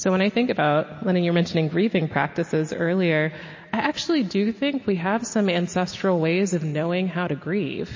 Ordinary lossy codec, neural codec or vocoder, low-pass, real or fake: MP3, 32 kbps; none; 7.2 kHz; real